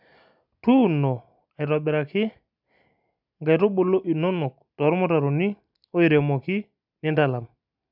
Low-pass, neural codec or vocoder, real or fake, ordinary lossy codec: 5.4 kHz; none; real; none